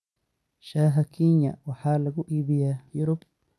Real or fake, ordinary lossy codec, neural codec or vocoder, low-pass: real; none; none; none